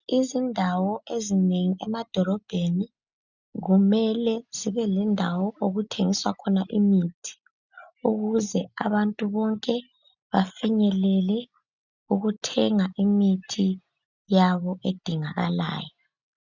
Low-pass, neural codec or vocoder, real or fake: 7.2 kHz; none; real